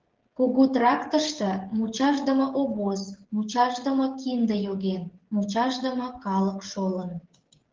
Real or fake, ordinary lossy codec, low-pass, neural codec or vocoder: fake; Opus, 16 kbps; 7.2 kHz; codec, 16 kHz, 16 kbps, FreqCodec, smaller model